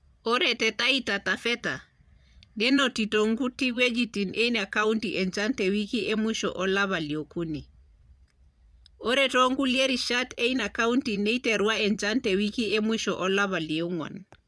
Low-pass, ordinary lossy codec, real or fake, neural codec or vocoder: none; none; fake; vocoder, 22.05 kHz, 80 mel bands, Vocos